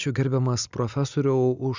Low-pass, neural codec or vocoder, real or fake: 7.2 kHz; none; real